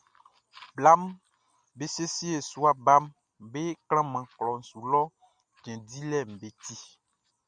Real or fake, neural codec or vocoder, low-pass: real; none; 9.9 kHz